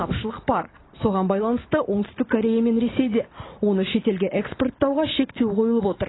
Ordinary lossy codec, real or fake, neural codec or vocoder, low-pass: AAC, 16 kbps; real; none; 7.2 kHz